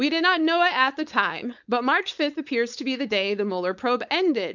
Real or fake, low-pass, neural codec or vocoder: fake; 7.2 kHz; codec, 16 kHz, 4.8 kbps, FACodec